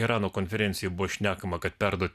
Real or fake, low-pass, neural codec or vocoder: real; 14.4 kHz; none